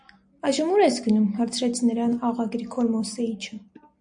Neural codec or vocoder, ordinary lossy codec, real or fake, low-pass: none; MP3, 48 kbps; real; 9.9 kHz